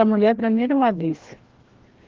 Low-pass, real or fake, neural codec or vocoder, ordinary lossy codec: 7.2 kHz; fake; codec, 16 kHz, 1 kbps, FreqCodec, larger model; Opus, 16 kbps